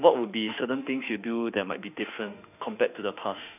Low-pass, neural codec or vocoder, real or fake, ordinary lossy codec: 3.6 kHz; autoencoder, 48 kHz, 32 numbers a frame, DAC-VAE, trained on Japanese speech; fake; none